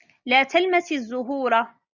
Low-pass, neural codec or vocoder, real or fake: 7.2 kHz; none; real